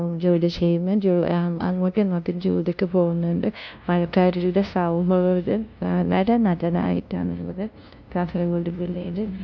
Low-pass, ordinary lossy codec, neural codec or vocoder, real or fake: 7.2 kHz; none; codec, 16 kHz, 0.5 kbps, FunCodec, trained on LibriTTS, 25 frames a second; fake